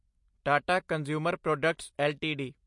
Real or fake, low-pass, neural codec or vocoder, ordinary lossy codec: real; 10.8 kHz; none; MP3, 48 kbps